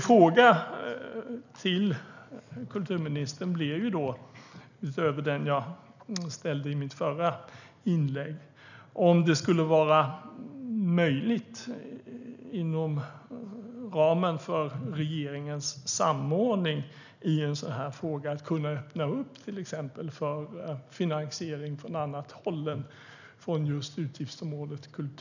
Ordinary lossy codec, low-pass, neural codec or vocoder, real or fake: none; 7.2 kHz; none; real